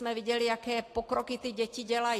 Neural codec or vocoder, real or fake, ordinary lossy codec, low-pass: none; real; AAC, 48 kbps; 14.4 kHz